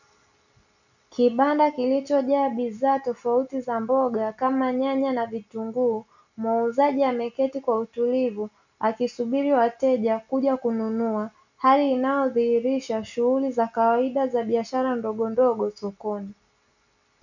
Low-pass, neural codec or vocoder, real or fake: 7.2 kHz; none; real